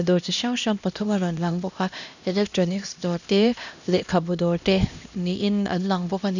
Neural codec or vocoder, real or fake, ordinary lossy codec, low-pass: codec, 16 kHz, 1 kbps, X-Codec, HuBERT features, trained on LibriSpeech; fake; none; 7.2 kHz